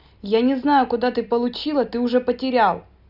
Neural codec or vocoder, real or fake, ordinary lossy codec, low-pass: none; real; none; 5.4 kHz